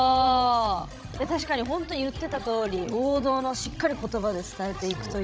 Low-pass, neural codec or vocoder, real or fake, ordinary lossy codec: none; codec, 16 kHz, 16 kbps, FreqCodec, larger model; fake; none